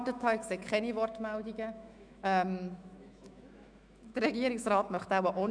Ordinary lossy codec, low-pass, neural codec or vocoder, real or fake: none; 9.9 kHz; autoencoder, 48 kHz, 128 numbers a frame, DAC-VAE, trained on Japanese speech; fake